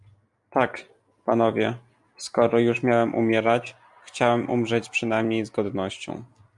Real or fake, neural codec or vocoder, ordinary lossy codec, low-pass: real; none; MP3, 64 kbps; 10.8 kHz